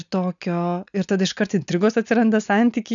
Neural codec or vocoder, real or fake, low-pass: none; real; 7.2 kHz